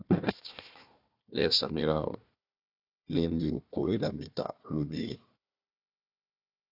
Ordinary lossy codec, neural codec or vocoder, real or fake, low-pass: AAC, 48 kbps; codec, 16 kHz, 1 kbps, FunCodec, trained on Chinese and English, 50 frames a second; fake; 5.4 kHz